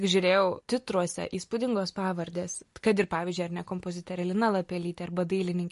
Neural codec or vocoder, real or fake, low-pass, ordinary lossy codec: none; real; 14.4 kHz; MP3, 48 kbps